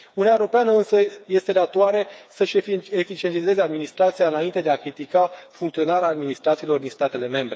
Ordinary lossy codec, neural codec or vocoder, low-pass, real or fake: none; codec, 16 kHz, 4 kbps, FreqCodec, smaller model; none; fake